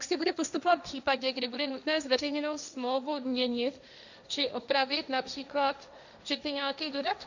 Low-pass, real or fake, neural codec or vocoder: 7.2 kHz; fake; codec, 16 kHz, 1.1 kbps, Voila-Tokenizer